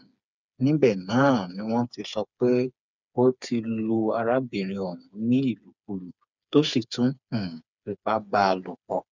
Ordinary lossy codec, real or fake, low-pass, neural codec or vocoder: none; fake; 7.2 kHz; codec, 16 kHz, 4 kbps, FreqCodec, smaller model